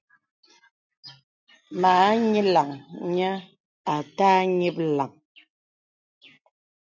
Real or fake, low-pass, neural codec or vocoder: real; 7.2 kHz; none